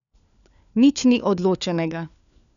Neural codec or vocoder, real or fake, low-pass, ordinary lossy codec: codec, 16 kHz, 4 kbps, FunCodec, trained on LibriTTS, 50 frames a second; fake; 7.2 kHz; none